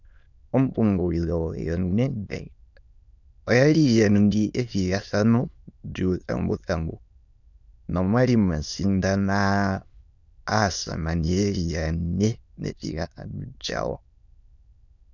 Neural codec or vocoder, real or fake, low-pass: autoencoder, 22.05 kHz, a latent of 192 numbers a frame, VITS, trained on many speakers; fake; 7.2 kHz